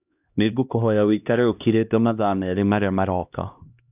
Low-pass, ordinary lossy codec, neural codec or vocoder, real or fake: 3.6 kHz; none; codec, 16 kHz, 1 kbps, X-Codec, HuBERT features, trained on LibriSpeech; fake